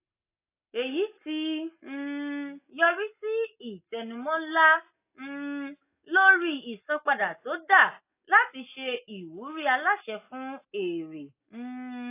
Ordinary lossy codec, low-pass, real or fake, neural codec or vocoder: AAC, 24 kbps; 3.6 kHz; real; none